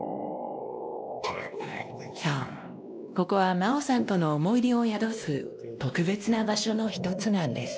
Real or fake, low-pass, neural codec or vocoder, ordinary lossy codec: fake; none; codec, 16 kHz, 1 kbps, X-Codec, WavLM features, trained on Multilingual LibriSpeech; none